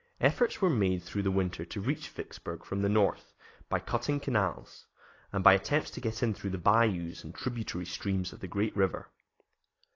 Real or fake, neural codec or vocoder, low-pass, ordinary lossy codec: real; none; 7.2 kHz; AAC, 32 kbps